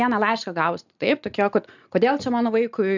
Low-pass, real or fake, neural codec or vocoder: 7.2 kHz; real; none